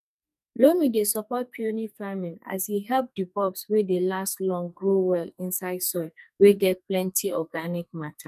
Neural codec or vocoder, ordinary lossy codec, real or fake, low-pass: codec, 44.1 kHz, 2.6 kbps, SNAC; none; fake; 14.4 kHz